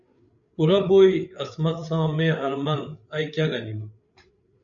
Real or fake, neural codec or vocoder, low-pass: fake; codec, 16 kHz, 8 kbps, FreqCodec, larger model; 7.2 kHz